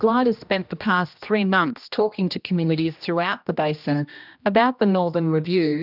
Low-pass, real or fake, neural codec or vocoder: 5.4 kHz; fake; codec, 16 kHz, 1 kbps, X-Codec, HuBERT features, trained on general audio